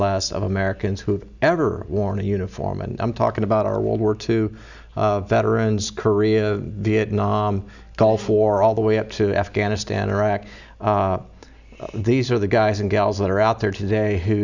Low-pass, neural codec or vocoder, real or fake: 7.2 kHz; none; real